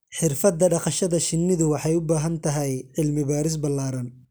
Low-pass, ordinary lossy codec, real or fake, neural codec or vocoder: none; none; real; none